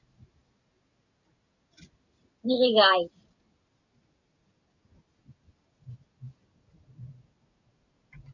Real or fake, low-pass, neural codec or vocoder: real; 7.2 kHz; none